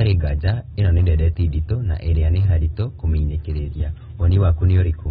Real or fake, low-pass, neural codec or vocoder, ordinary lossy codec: real; 19.8 kHz; none; AAC, 16 kbps